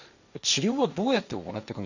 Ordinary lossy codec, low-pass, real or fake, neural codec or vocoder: none; 7.2 kHz; fake; codec, 16 kHz, 1.1 kbps, Voila-Tokenizer